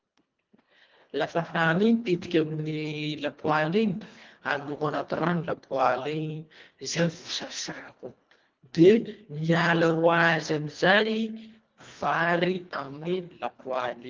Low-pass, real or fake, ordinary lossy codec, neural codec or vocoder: 7.2 kHz; fake; Opus, 32 kbps; codec, 24 kHz, 1.5 kbps, HILCodec